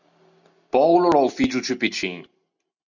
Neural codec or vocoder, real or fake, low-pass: none; real; 7.2 kHz